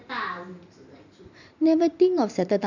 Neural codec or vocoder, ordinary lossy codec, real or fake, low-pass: vocoder, 44.1 kHz, 128 mel bands every 512 samples, BigVGAN v2; none; fake; 7.2 kHz